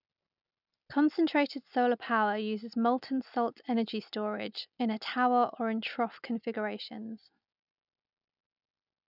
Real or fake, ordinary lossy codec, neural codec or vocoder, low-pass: real; none; none; 5.4 kHz